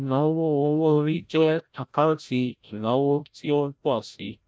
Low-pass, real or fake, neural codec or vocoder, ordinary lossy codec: none; fake; codec, 16 kHz, 0.5 kbps, FreqCodec, larger model; none